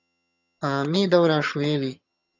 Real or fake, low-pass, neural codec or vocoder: fake; 7.2 kHz; vocoder, 22.05 kHz, 80 mel bands, HiFi-GAN